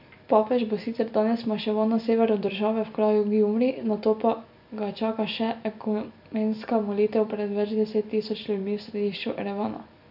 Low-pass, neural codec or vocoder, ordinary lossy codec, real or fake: 5.4 kHz; none; none; real